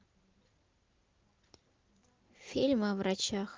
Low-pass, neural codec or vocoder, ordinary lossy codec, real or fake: 7.2 kHz; none; Opus, 32 kbps; real